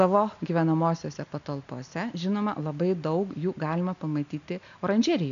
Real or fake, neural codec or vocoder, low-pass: real; none; 7.2 kHz